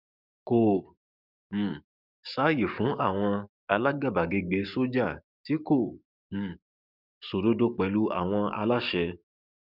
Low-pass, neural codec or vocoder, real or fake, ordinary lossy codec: 5.4 kHz; codec, 44.1 kHz, 7.8 kbps, DAC; fake; none